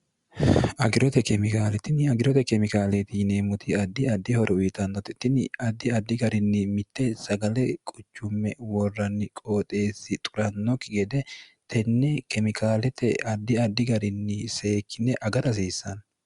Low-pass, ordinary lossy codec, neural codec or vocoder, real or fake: 10.8 kHz; Opus, 64 kbps; none; real